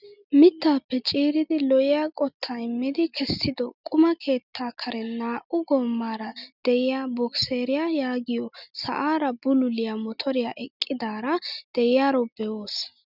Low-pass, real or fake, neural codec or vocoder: 5.4 kHz; real; none